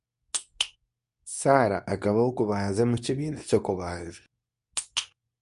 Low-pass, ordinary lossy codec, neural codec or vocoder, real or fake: 10.8 kHz; none; codec, 24 kHz, 0.9 kbps, WavTokenizer, medium speech release version 2; fake